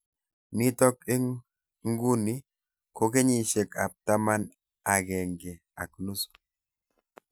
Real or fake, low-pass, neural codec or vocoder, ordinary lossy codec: real; none; none; none